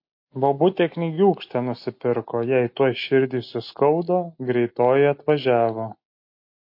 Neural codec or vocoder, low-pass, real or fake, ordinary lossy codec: none; 5.4 kHz; real; MP3, 32 kbps